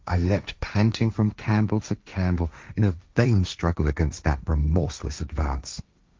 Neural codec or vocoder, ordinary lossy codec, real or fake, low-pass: codec, 16 kHz, 1.1 kbps, Voila-Tokenizer; Opus, 32 kbps; fake; 7.2 kHz